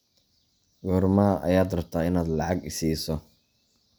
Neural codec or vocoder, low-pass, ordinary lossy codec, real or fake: none; none; none; real